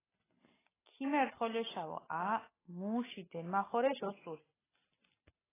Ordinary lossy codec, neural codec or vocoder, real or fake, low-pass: AAC, 16 kbps; none; real; 3.6 kHz